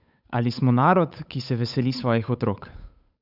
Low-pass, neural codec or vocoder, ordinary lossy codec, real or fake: 5.4 kHz; codec, 16 kHz, 8 kbps, FunCodec, trained on Chinese and English, 25 frames a second; none; fake